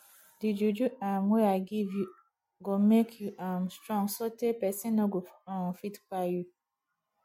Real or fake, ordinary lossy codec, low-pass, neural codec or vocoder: real; MP3, 64 kbps; 19.8 kHz; none